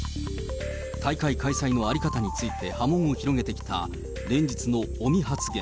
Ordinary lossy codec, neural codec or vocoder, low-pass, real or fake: none; none; none; real